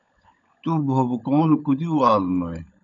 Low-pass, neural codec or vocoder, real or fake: 7.2 kHz; codec, 16 kHz, 16 kbps, FunCodec, trained on LibriTTS, 50 frames a second; fake